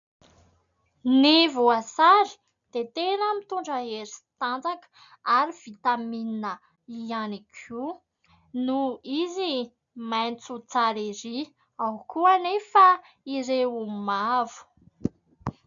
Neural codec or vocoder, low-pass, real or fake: none; 7.2 kHz; real